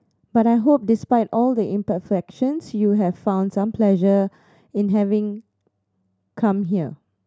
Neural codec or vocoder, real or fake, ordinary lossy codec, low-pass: none; real; none; none